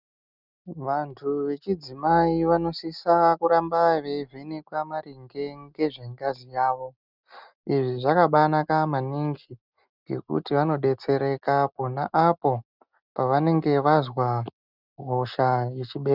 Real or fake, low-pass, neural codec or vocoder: real; 5.4 kHz; none